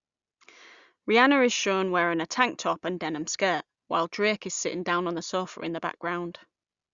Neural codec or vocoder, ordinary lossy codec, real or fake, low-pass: none; Opus, 64 kbps; real; 7.2 kHz